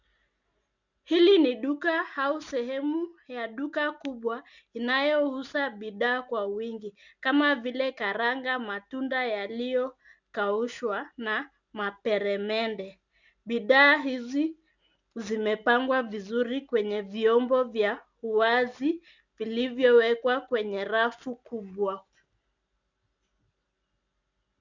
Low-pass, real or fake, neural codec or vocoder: 7.2 kHz; real; none